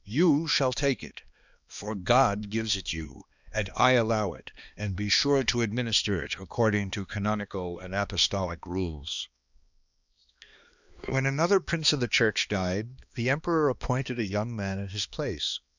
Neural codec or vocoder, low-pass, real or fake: codec, 16 kHz, 2 kbps, X-Codec, HuBERT features, trained on balanced general audio; 7.2 kHz; fake